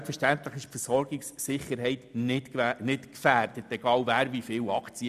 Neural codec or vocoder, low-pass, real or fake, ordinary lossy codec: none; 14.4 kHz; real; none